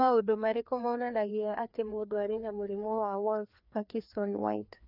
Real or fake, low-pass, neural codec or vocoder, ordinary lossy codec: fake; 5.4 kHz; codec, 16 kHz, 2 kbps, FreqCodec, larger model; none